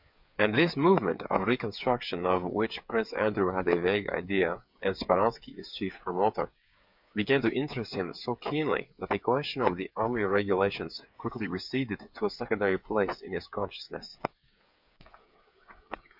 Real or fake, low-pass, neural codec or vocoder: fake; 5.4 kHz; codec, 16 kHz, 8 kbps, FreqCodec, smaller model